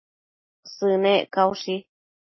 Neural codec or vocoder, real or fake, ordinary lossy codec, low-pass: none; real; MP3, 24 kbps; 7.2 kHz